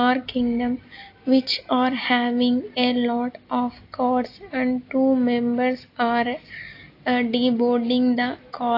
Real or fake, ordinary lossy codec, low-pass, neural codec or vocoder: real; AAC, 32 kbps; 5.4 kHz; none